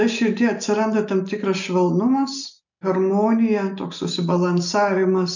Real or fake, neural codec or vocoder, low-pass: real; none; 7.2 kHz